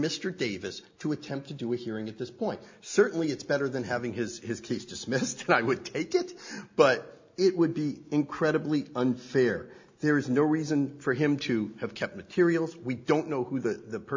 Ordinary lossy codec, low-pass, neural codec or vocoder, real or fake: MP3, 64 kbps; 7.2 kHz; none; real